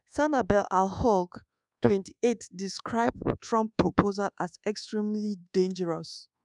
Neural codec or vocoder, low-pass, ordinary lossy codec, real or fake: codec, 24 kHz, 1.2 kbps, DualCodec; 10.8 kHz; none; fake